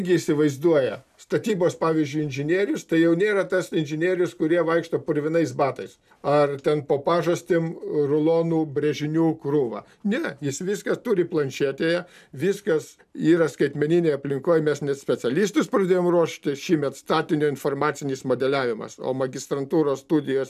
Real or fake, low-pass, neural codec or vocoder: real; 14.4 kHz; none